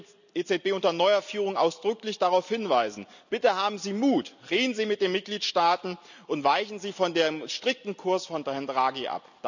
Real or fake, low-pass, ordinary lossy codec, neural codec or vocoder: real; 7.2 kHz; none; none